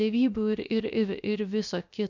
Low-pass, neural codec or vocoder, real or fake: 7.2 kHz; codec, 16 kHz, about 1 kbps, DyCAST, with the encoder's durations; fake